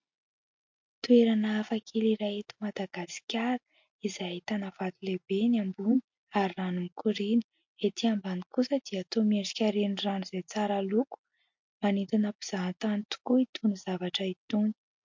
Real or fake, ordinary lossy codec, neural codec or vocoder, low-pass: real; MP3, 48 kbps; none; 7.2 kHz